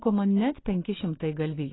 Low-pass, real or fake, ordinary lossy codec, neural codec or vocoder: 7.2 kHz; real; AAC, 16 kbps; none